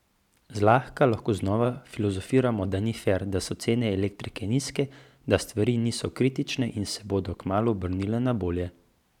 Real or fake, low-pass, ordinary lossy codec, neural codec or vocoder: fake; 19.8 kHz; none; vocoder, 44.1 kHz, 128 mel bands every 512 samples, BigVGAN v2